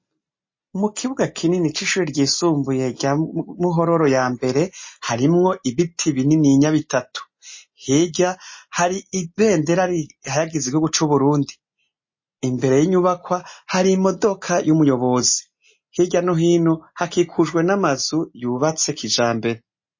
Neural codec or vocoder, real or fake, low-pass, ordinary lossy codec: none; real; 7.2 kHz; MP3, 32 kbps